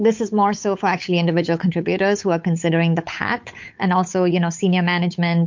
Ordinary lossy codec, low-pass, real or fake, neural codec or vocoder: MP3, 48 kbps; 7.2 kHz; fake; codec, 16 kHz, 8 kbps, FunCodec, trained on Chinese and English, 25 frames a second